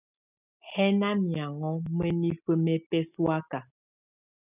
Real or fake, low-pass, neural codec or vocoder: real; 3.6 kHz; none